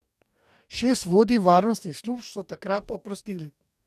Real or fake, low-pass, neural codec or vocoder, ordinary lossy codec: fake; 14.4 kHz; codec, 44.1 kHz, 2.6 kbps, DAC; none